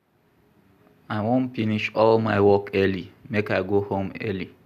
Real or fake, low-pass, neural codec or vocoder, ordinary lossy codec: real; 14.4 kHz; none; none